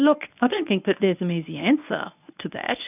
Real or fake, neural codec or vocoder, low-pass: fake; codec, 24 kHz, 0.9 kbps, WavTokenizer, medium speech release version 1; 3.6 kHz